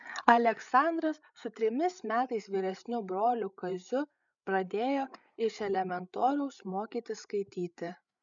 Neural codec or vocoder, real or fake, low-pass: codec, 16 kHz, 8 kbps, FreqCodec, larger model; fake; 7.2 kHz